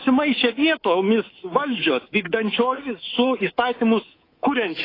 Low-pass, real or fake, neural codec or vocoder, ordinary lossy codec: 5.4 kHz; fake; codec, 24 kHz, 3.1 kbps, DualCodec; AAC, 24 kbps